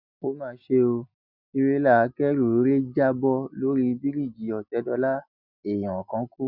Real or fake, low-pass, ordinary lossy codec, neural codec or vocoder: real; 5.4 kHz; none; none